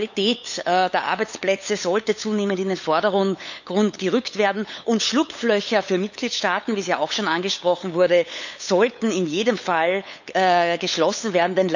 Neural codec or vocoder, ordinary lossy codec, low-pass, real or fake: codec, 16 kHz, 8 kbps, FunCodec, trained on LibriTTS, 25 frames a second; none; 7.2 kHz; fake